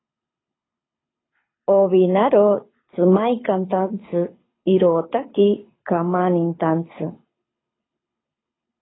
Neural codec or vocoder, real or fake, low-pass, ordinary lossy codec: codec, 24 kHz, 6 kbps, HILCodec; fake; 7.2 kHz; AAC, 16 kbps